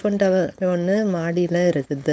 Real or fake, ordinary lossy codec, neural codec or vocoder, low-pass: fake; none; codec, 16 kHz, 4.8 kbps, FACodec; none